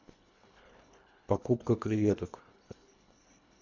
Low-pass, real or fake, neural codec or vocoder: 7.2 kHz; fake; codec, 24 kHz, 1.5 kbps, HILCodec